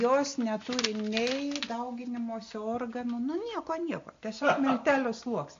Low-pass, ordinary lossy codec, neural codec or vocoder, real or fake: 7.2 kHz; AAC, 48 kbps; none; real